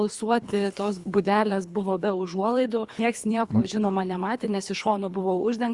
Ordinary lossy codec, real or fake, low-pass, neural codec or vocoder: Opus, 64 kbps; fake; 10.8 kHz; codec, 24 kHz, 3 kbps, HILCodec